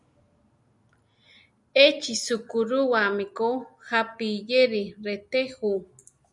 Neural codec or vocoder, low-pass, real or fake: none; 10.8 kHz; real